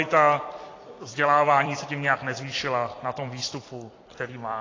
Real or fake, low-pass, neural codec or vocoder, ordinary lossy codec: fake; 7.2 kHz; vocoder, 44.1 kHz, 128 mel bands every 256 samples, BigVGAN v2; AAC, 32 kbps